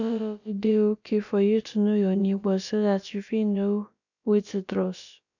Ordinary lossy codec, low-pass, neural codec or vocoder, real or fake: none; 7.2 kHz; codec, 16 kHz, about 1 kbps, DyCAST, with the encoder's durations; fake